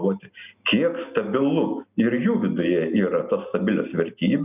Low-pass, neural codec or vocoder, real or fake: 3.6 kHz; none; real